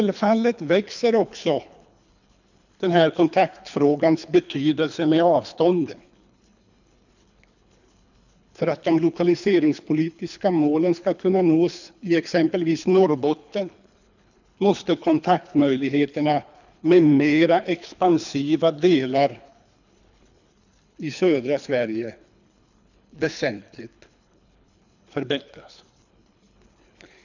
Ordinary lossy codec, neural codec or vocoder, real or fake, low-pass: none; codec, 24 kHz, 3 kbps, HILCodec; fake; 7.2 kHz